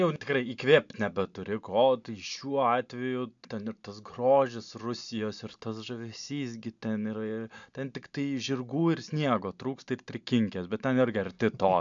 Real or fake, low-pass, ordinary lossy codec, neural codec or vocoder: real; 7.2 kHz; MP3, 64 kbps; none